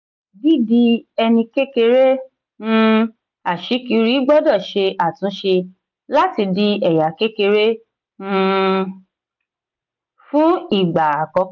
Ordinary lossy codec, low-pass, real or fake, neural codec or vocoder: none; 7.2 kHz; real; none